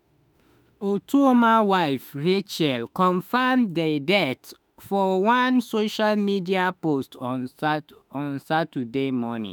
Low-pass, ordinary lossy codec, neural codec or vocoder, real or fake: none; none; autoencoder, 48 kHz, 32 numbers a frame, DAC-VAE, trained on Japanese speech; fake